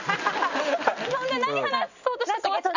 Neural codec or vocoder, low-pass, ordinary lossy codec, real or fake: none; 7.2 kHz; none; real